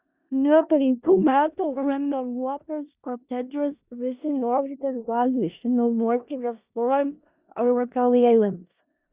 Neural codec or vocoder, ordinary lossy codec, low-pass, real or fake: codec, 16 kHz in and 24 kHz out, 0.4 kbps, LongCat-Audio-Codec, four codebook decoder; Opus, 64 kbps; 3.6 kHz; fake